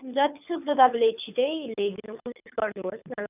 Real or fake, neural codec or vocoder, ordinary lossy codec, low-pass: fake; codec, 24 kHz, 6 kbps, HILCodec; none; 3.6 kHz